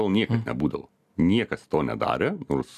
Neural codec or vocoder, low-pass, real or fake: none; 14.4 kHz; real